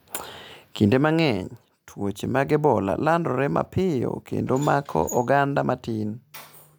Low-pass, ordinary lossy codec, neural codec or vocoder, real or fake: none; none; none; real